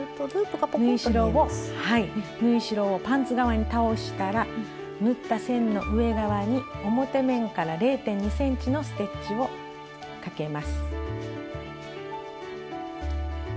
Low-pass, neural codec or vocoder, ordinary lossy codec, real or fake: none; none; none; real